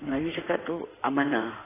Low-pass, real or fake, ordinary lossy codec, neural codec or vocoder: 3.6 kHz; fake; AAC, 16 kbps; codec, 16 kHz in and 24 kHz out, 2.2 kbps, FireRedTTS-2 codec